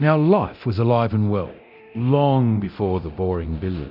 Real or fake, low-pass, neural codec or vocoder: fake; 5.4 kHz; codec, 24 kHz, 0.9 kbps, DualCodec